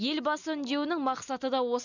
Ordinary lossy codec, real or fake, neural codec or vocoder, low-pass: none; real; none; 7.2 kHz